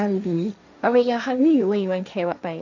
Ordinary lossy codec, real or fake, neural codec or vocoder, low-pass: none; fake; codec, 16 kHz, 1.1 kbps, Voila-Tokenizer; 7.2 kHz